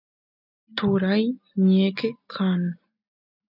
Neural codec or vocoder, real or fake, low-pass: none; real; 5.4 kHz